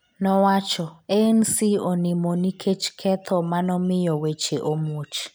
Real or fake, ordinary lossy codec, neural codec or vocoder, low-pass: real; none; none; none